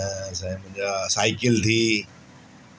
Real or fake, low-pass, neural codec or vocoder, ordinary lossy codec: real; none; none; none